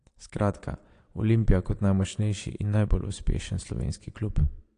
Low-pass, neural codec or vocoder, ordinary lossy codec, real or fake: 9.9 kHz; vocoder, 22.05 kHz, 80 mel bands, Vocos; AAC, 48 kbps; fake